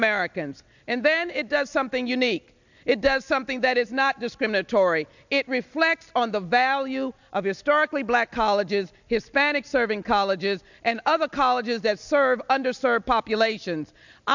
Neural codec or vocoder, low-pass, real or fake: none; 7.2 kHz; real